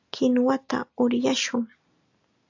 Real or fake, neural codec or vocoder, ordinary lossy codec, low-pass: real; none; AAC, 48 kbps; 7.2 kHz